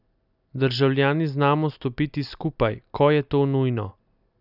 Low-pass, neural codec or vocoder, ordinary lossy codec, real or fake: 5.4 kHz; none; none; real